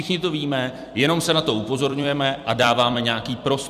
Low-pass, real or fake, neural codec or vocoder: 14.4 kHz; fake; vocoder, 44.1 kHz, 128 mel bands every 256 samples, BigVGAN v2